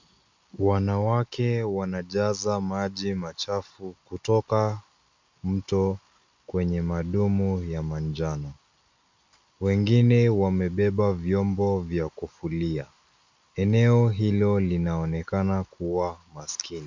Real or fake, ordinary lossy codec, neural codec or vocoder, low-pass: real; MP3, 64 kbps; none; 7.2 kHz